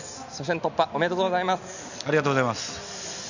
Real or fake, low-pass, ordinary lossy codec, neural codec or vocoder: real; 7.2 kHz; none; none